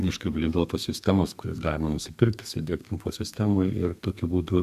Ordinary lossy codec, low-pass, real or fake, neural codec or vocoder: Opus, 64 kbps; 14.4 kHz; fake; codec, 32 kHz, 1.9 kbps, SNAC